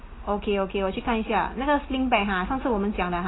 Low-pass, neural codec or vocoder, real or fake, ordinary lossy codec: 7.2 kHz; none; real; AAC, 16 kbps